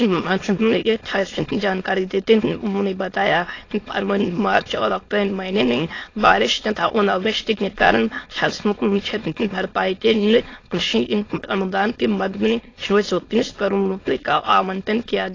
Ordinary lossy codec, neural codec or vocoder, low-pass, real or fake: AAC, 32 kbps; autoencoder, 22.05 kHz, a latent of 192 numbers a frame, VITS, trained on many speakers; 7.2 kHz; fake